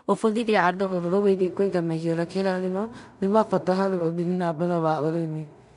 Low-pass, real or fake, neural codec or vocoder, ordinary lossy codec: 10.8 kHz; fake; codec, 16 kHz in and 24 kHz out, 0.4 kbps, LongCat-Audio-Codec, two codebook decoder; none